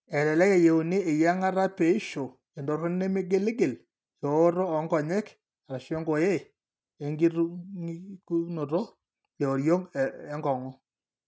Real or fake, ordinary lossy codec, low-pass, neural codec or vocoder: real; none; none; none